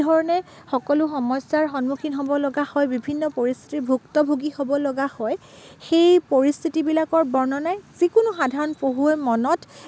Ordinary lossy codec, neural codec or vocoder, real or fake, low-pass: none; none; real; none